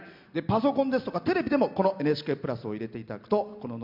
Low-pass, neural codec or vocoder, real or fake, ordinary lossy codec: 5.4 kHz; none; real; MP3, 48 kbps